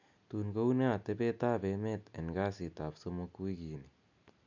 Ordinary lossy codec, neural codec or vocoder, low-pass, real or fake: none; none; 7.2 kHz; real